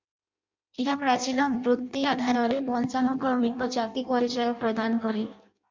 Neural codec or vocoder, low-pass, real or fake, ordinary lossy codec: codec, 16 kHz in and 24 kHz out, 0.6 kbps, FireRedTTS-2 codec; 7.2 kHz; fake; MP3, 64 kbps